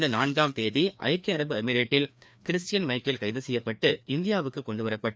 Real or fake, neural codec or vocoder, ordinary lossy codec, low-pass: fake; codec, 16 kHz, 2 kbps, FreqCodec, larger model; none; none